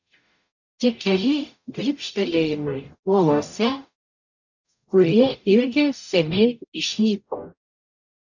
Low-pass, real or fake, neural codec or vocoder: 7.2 kHz; fake; codec, 44.1 kHz, 0.9 kbps, DAC